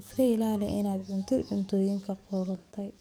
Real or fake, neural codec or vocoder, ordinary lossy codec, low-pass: fake; codec, 44.1 kHz, 7.8 kbps, DAC; none; none